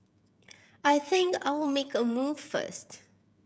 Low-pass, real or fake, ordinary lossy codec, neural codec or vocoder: none; fake; none; codec, 16 kHz, 16 kbps, FreqCodec, smaller model